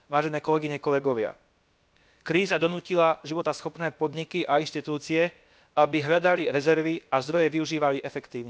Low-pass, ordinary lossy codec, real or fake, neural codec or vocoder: none; none; fake; codec, 16 kHz, about 1 kbps, DyCAST, with the encoder's durations